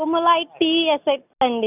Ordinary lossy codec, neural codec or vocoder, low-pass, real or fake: none; none; 3.6 kHz; real